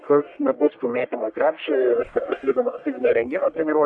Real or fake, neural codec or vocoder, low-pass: fake; codec, 44.1 kHz, 1.7 kbps, Pupu-Codec; 9.9 kHz